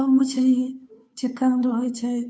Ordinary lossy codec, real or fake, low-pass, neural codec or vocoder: none; fake; none; codec, 16 kHz, 2 kbps, FunCodec, trained on Chinese and English, 25 frames a second